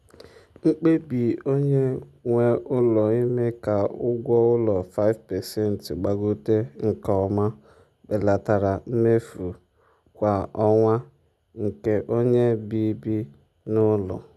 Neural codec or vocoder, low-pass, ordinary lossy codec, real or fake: none; none; none; real